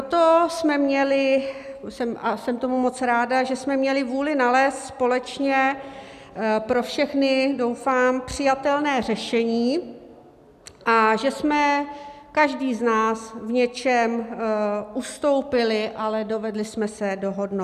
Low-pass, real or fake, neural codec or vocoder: 14.4 kHz; real; none